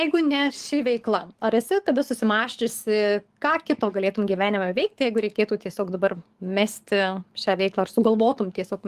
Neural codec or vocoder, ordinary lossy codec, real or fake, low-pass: codec, 44.1 kHz, 7.8 kbps, DAC; Opus, 24 kbps; fake; 14.4 kHz